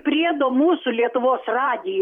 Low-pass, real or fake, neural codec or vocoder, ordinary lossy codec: 19.8 kHz; fake; vocoder, 44.1 kHz, 128 mel bands every 512 samples, BigVGAN v2; MP3, 96 kbps